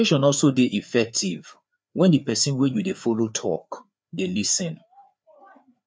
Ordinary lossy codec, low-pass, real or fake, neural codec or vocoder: none; none; fake; codec, 16 kHz, 4 kbps, FreqCodec, larger model